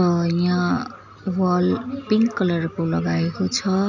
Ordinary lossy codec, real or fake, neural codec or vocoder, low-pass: none; real; none; 7.2 kHz